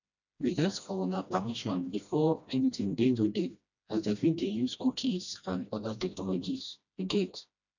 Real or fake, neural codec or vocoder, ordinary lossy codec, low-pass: fake; codec, 16 kHz, 1 kbps, FreqCodec, smaller model; none; 7.2 kHz